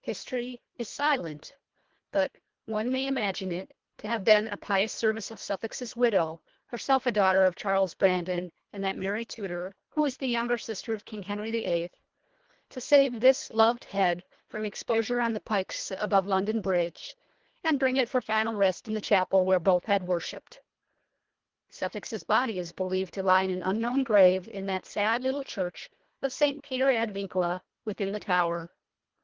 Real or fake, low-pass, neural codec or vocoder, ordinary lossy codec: fake; 7.2 kHz; codec, 24 kHz, 1.5 kbps, HILCodec; Opus, 16 kbps